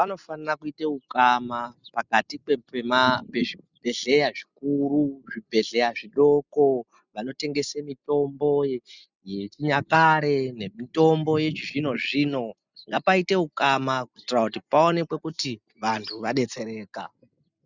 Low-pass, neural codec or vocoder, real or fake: 7.2 kHz; none; real